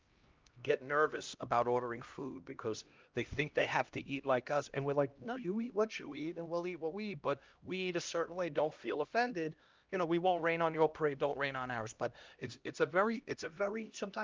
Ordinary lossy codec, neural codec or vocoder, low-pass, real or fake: Opus, 24 kbps; codec, 16 kHz, 1 kbps, X-Codec, HuBERT features, trained on LibriSpeech; 7.2 kHz; fake